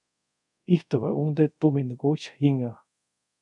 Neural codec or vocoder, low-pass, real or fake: codec, 24 kHz, 0.5 kbps, DualCodec; 10.8 kHz; fake